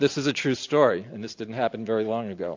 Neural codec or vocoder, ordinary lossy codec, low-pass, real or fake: none; AAC, 48 kbps; 7.2 kHz; real